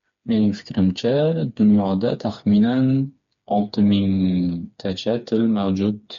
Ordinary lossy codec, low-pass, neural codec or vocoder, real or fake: MP3, 48 kbps; 7.2 kHz; codec, 16 kHz, 4 kbps, FreqCodec, smaller model; fake